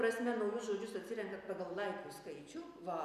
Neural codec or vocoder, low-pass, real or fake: none; 14.4 kHz; real